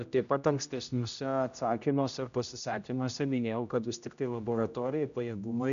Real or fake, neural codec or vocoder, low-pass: fake; codec, 16 kHz, 0.5 kbps, X-Codec, HuBERT features, trained on general audio; 7.2 kHz